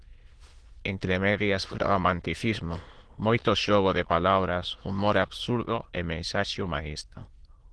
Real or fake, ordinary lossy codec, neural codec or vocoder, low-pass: fake; Opus, 16 kbps; autoencoder, 22.05 kHz, a latent of 192 numbers a frame, VITS, trained on many speakers; 9.9 kHz